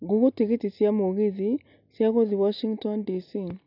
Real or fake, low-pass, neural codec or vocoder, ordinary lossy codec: real; 5.4 kHz; none; none